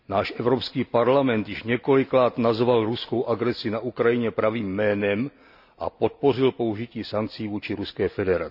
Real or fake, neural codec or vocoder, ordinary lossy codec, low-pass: real; none; none; 5.4 kHz